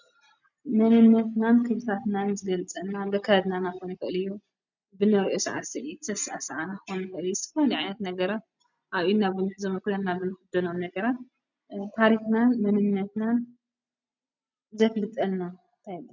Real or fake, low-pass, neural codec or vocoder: real; 7.2 kHz; none